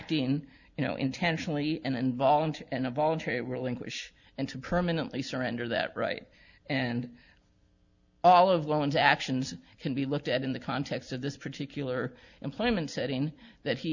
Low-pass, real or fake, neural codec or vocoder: 7.2 kHz; real; none